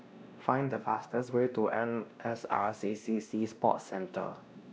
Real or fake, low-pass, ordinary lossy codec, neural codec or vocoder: fake; none; none; codec, 16 kHz, 1 kbps, X-Codec, WavLM features, trained on Multilingual LibriSpeech